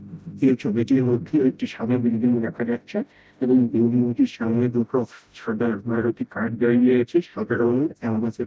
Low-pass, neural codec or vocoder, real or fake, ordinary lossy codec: none; codec, 16 kHz, 0.5 kbps, FreqCodec, smaller model; fake; none